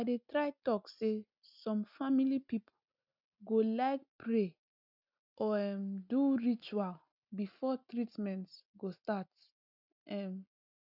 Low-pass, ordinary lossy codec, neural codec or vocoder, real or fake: 5.4 kHz; none; none; real